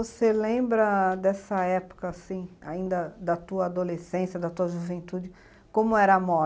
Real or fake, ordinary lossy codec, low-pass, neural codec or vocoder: real; none; none; none